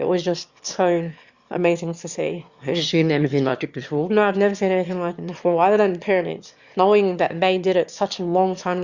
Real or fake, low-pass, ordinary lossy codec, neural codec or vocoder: fake; 7.2 kHz; Opus, 64 kbps; autoencoder, 22.05 kHz, a latent of 192 numbers a frame, VITS, trained on one speaker